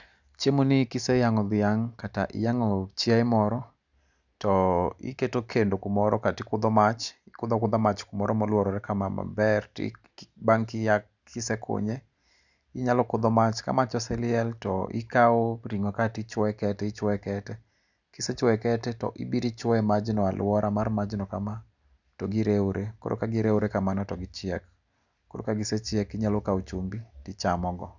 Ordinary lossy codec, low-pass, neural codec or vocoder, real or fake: none; 7.2 kHz; none; real